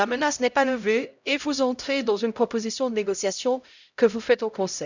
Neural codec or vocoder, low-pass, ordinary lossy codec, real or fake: codec, 16 kHz, 0.5 kbps, X-Codec, HuBERT features, trained on LibriSpeech; 7.2 kHz; none; fake